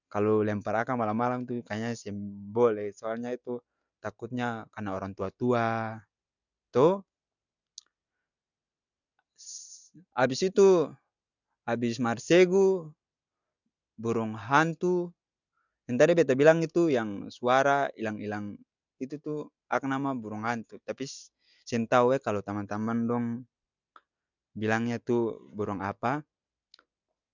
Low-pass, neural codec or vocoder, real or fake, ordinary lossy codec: 7.2 kHz; none; real; none